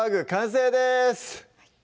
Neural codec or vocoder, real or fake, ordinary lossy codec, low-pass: none; real; none; none